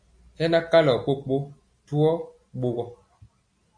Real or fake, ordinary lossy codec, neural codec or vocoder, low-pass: real; MP3, 48 kbps; none; 9.9 kHz